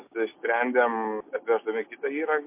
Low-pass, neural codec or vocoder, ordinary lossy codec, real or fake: 3.6 kHz; none; MP3, 24 kbps; real